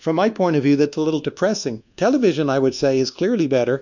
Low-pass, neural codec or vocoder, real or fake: 7.2 kHz; codec, 24 kHz, 1.2 kbps, DualCodec; fake